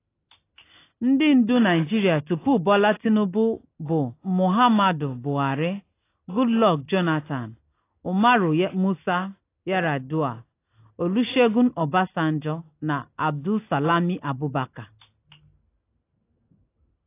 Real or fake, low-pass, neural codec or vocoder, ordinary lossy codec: real; 3.6 kHz; none; AAC, 24 kbps